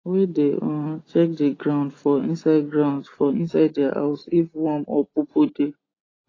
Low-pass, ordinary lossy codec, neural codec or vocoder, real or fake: 7.2 kHz; AAC, 32 kbps; none; real